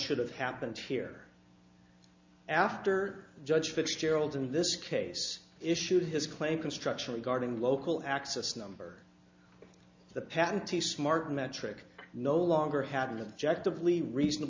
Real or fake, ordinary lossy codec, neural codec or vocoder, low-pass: real; MP3, 64 kbps; none; 7.2 kHz